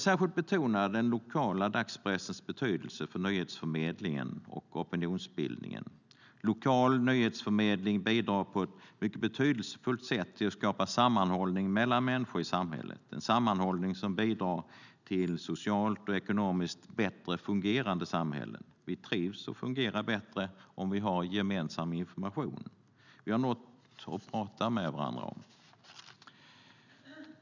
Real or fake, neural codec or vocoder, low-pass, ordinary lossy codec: real; none; 7.2 kHz; none